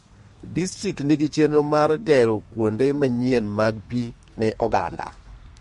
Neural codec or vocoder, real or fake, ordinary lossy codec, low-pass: codec, 32 kHz, 1.9 kbps, SNAC; fake; MP3, 48 kbps; 14.4 kHz